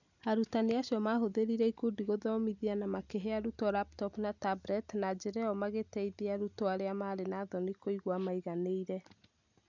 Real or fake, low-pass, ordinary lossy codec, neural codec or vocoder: real; 7.2 kHz; none; none